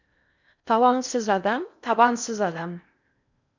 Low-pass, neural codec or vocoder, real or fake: 7.2 kHz; codec, 16 kHz in and 24 kHz out, 0.6 kbps, FocalCodec, streaming, 4096 codes; fake